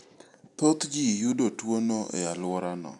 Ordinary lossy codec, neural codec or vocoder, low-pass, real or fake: none; none; 10.8 kHz; real